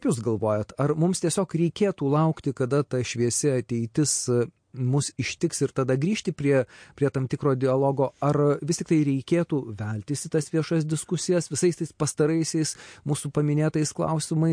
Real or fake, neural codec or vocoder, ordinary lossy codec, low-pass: real; none; MP3, 48 kbps; 9.9 kHz